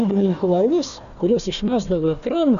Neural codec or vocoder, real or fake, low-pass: codec, 16 kHz, 1 kbps, FunCodec, trained on Chinese and English, 50 frames a second; fake; 7.2 kHz